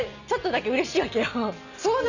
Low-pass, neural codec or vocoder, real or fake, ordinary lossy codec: 7.2 kHz; none; real; none